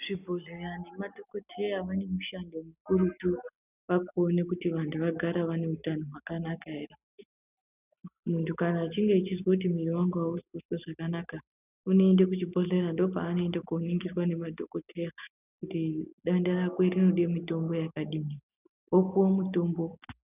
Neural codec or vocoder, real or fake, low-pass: none; real; 3.6 kHz